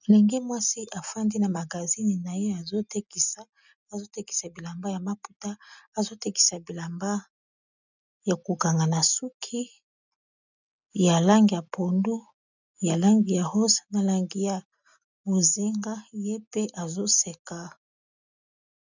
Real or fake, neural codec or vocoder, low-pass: real; none; 7.2 kHz